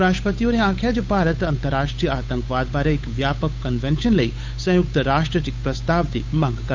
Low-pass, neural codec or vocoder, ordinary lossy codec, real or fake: 7.2 kHz; codec, 16 kHz, 8 kbps, FunCodec, trained on Chinese and English, 25 frames a second; MP3, 64 kbps; fake